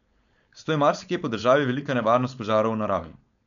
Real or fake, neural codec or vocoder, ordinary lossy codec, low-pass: fake; codec, 16 kHz, 4.8 kbps, FACodec; none; 7.2 kHz